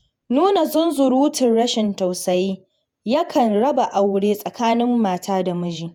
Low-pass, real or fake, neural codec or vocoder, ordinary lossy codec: 19.8 kHz; fake; vocoder, 48 kHz, 128 mel bands, Vocos; none